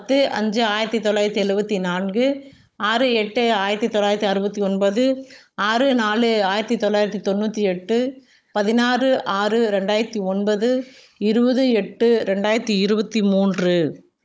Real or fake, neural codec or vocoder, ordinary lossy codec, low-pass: fake; codec, 16 kHz, 16 kbps, FunCodec, trained on Chinese and English, 50 frames a second; none; none